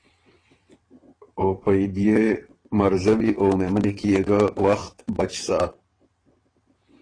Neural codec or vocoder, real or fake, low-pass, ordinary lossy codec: vocoder, 22.05 kHz, 80 mel bands, WaveNeXt; fake; 9.9 kHz; AAC, 32 kbps